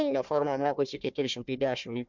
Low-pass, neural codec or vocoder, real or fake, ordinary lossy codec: 7.2 kHz; codec, 16 kHz, 1 kbps, FunCodec, trained on Chinese and English, 50 frames a second; fake; MP3, 64 kbps